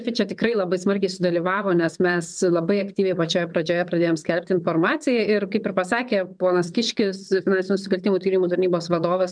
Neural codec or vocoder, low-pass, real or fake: vocoder, 22.05 kHz, 80 mel bands, WaveNeXt; 9.9 kHz; fake